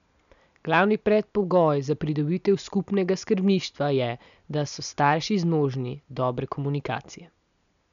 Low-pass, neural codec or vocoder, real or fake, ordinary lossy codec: 7.2 kHz; none; real; none